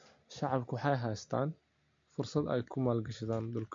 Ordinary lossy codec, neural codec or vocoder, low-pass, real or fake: MP3, 48 kbps; none; 7.2 kHz; real